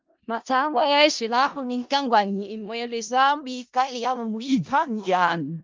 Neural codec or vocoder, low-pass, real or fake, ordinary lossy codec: codec, 16 kHz in and 24 kHz out, 0.4 kbps, LongCat-Audio-Codec, four codebook decoder; 7.2 kHz; fake; Opus, 24 kbps